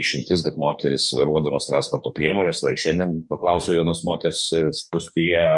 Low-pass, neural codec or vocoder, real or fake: 10.8 kHz; codec, 44.1 kHz, 2.6 kbps, DAC; fake